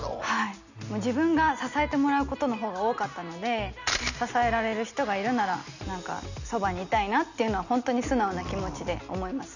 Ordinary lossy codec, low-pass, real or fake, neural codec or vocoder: none; 7.2 kHz; real; none